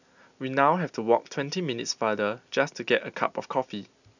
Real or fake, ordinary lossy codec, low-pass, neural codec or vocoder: real; none; 7.2 kHz; none